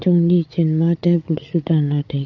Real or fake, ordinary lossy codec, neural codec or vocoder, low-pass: fake; none; codec, 16 kHz, 8 kbps, FreqCodec, larger model; 7.2 kHz